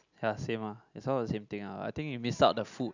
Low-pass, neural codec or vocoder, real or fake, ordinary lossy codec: 7.2 kHz; none; real; none